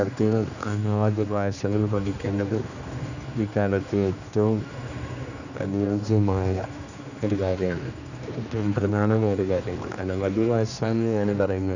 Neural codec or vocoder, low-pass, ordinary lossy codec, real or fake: codec, 16 kHz, 2 kbps, X-Codec, HuBERT features, trained on general audio; 7.2 kHz; none; fake